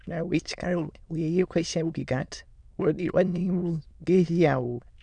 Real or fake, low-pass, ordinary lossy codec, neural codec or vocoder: fake; 9.9 kHz; none; autoencoder, 22.05 kHz, a latent of 192 numbers a frame, VITS, trained on many speakers